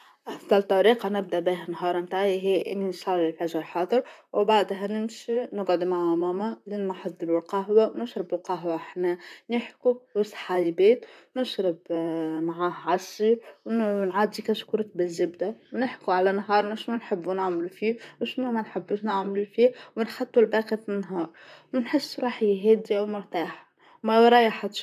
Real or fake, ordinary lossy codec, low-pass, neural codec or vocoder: fake; none; 14.4 kHz; vocoder, 44.1 kHz, 128 mel bands, Pupu-Vocoder